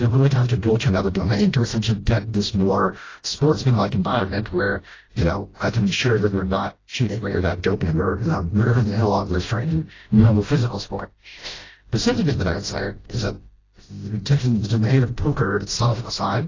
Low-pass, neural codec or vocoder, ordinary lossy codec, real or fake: 7.2 kHz; codec, 16 kHz, 0.5 kbps, FreqCodec, smaller model; AAC, 32 kbps; fake